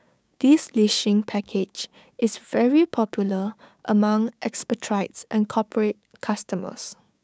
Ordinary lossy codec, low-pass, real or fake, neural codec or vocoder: none; none; fake; codec, 16 kHz, 6 kbps, DAC